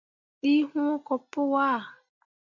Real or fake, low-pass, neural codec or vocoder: real; 7.2 kHz; none